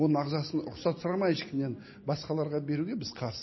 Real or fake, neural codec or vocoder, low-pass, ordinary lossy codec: real; none; 7.2 kHz; MP3, 24 kbps